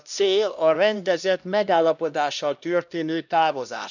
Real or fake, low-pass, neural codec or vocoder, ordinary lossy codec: fake; 7.2 kHz; codec, 16 kHz, 1 kbps, X-Codec, HuBERT features, trained on LibriSpeech; none